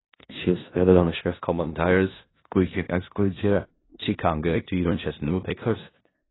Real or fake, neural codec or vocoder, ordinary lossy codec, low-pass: fake; codec, 16 kHz in and 24 kHz out, 0.4 kbps, LongCat-Audio-Codec, four codebook decoder; AAC, 16 kbps; 7.2 kHz